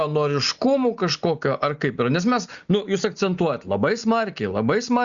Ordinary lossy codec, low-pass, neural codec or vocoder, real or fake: Opus, 64 kbps; 7.2 kHz; none; real